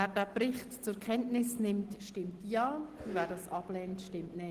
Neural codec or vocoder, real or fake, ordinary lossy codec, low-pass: none; real; Opus, 16 kbps; 14.4 kHz